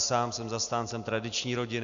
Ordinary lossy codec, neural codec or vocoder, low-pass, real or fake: Opus, 64 kbps; none; 7.2 kHz; real